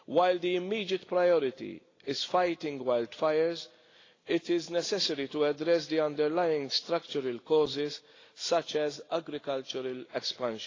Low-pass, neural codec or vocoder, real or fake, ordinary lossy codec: 7.2 kHz; none; real; AAC, 32 kbps